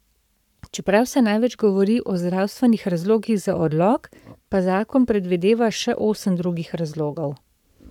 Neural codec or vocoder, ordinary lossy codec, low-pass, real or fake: codec, 44.1 kHz, 7.8 kbps, Pupu-Codec; none; 19.8 kHz; fake